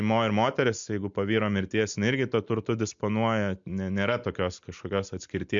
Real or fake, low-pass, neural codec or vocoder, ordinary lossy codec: real; 7.2 kHz; none; MP3, 64 kbps